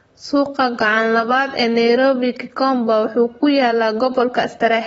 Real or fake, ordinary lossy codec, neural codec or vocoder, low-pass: fake; AAC, 24 kbps; vocoder, 44.1 kHz, 128 mel bands, Pupu-Vocoder; 19.8 kHz